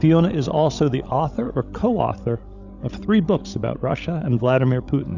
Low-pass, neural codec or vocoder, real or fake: 7.2 kHz; codec, 16 kHz, 16 kbps, FreqCodec, larger model; fake